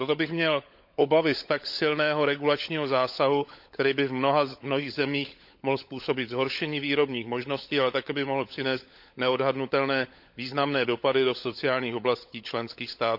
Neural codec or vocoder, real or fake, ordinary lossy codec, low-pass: codec, 16 kHz, 16 kbps, FunCodec, trained on LibriTTS, 50 frames a second; fake; none; 5.4 kHz